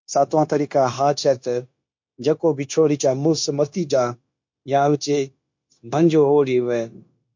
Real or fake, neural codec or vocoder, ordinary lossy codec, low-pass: fake; codec, 16 kHz, 0.9 kbps, LongCat-Audio-Codec; MP3, 48 kbps; 7.2 kHz